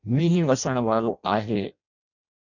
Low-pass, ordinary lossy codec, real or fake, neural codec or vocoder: 7.2 kHz; MP3, 64 kbps; fake; codec, 16 kHz in and 24 kHz out, 0.6 kbps, FireRedTTS-2 codec